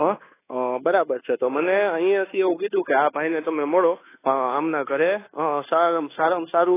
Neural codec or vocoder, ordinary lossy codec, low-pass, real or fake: codec, 24 kHz, 1.2 kbps, DualCodec; AAC, 16 kbps; 3.6 kHz; fake